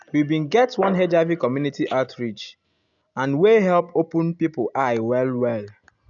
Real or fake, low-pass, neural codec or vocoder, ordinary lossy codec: real; 7.2 kHz; none; none